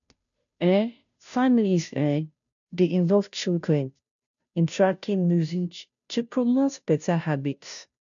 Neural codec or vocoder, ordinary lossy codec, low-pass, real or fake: codec, 16 kHz, 0.5 kbps, FunCodec, trained on Chinese and English, 25 frames a second; none; 7.2 kHz; fake